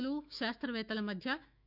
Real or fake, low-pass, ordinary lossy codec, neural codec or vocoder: fake; 5.4 kHz; none; autoencoder, 48 kHz, 128 numbers a frame, DAC-VAE, trained on Japanese speech